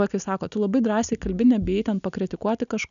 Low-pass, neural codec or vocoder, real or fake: 7.2 kHz; none; real